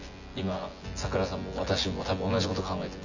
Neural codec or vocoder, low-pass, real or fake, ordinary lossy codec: vocoder, 24 kHz, 100 mel bands, Vocos; 7.2 kHz; fake; none